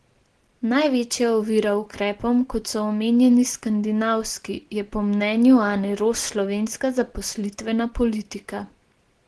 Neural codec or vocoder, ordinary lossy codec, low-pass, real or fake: none; Opus, 16 kbps; 10.8 kHz; real